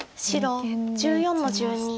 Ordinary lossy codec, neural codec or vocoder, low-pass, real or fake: none; none; none; real